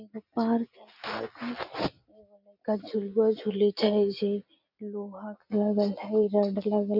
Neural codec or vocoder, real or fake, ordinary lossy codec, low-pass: none; real; none; 5.4 kHz